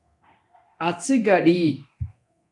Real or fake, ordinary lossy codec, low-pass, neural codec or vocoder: fake; AAC, 48 kbps; 10.8 kHz; codec, 24 kHz, 0.9 kbps, DualCodec